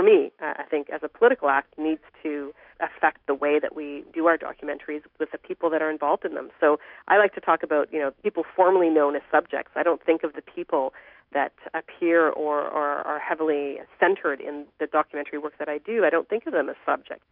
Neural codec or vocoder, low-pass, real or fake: autoencoder, 48 kHz, 128 numbers a frame, DAC-VAE, trained on Japanese speech; 5.4 kHz; fake